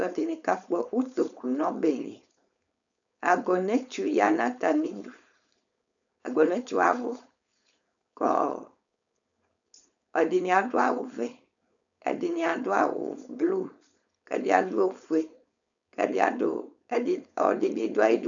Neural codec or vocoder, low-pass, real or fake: codec, 16 kHz, 4.8 kbps, FACodec; 7.2 kHz; fake